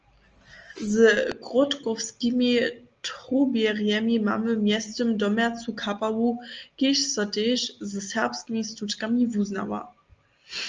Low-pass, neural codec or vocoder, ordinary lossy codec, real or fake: 7.2 kHz; none; Opus, 24 kbps; real